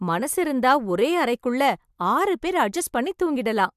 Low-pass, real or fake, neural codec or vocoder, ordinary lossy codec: 14.4 kHz; real; none; MP3, 96 kbps